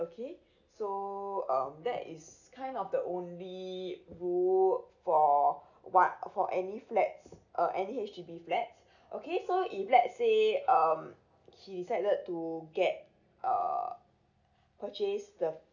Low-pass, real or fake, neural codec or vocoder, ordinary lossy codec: 7.2 kHz; real; none; none